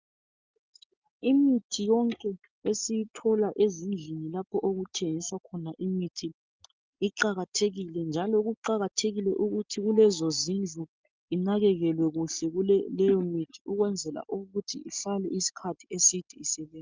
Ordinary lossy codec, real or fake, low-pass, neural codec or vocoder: Opus, 24 kbps; real; 7.2 kHz; none